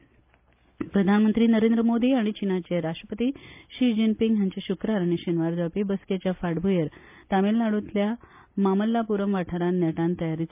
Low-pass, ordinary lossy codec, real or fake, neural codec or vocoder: 3.6 kHz; MP3, 32 kbps; real; none